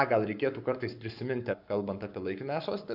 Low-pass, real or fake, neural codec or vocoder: 5.4 kHz; fake; codec, 44.1 kHz, 7.8 kbps, Pupu-Codec